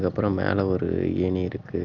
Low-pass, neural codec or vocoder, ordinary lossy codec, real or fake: 7.2 kHz; none; Opus, 24 kbps; real